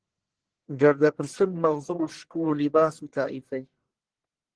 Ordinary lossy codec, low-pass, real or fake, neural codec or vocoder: Opus, 16 kbps; 9.9 kHz; fake; codec, 44.1 kHz, 1.7 kbps, Pupu-Codec